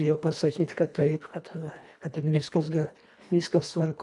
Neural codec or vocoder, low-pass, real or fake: codec, 24 kHz, 1.5 kbps, HILCodec; 10.8 kHz; fake